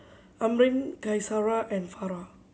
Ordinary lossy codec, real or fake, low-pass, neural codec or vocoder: none; real; none; none